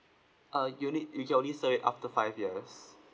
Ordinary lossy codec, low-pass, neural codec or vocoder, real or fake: none; none; none; real